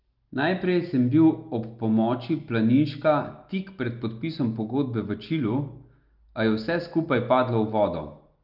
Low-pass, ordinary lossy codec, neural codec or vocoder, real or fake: 5.4 kHz; Opus, 24 kbps; none; real